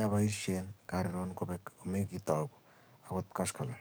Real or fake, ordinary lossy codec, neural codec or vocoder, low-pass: fake; none; codec, 44.1 kHz, 7.8 kbps, DAC; none